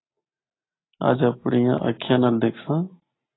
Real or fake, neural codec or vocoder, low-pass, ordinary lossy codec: real; none; 7.2 kHz; AAC, 16 kbps